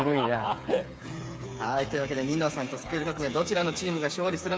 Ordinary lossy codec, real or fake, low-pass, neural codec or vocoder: none; fake; none; codec, 16 kHz, 8 kbps, FreqCodec, smaller model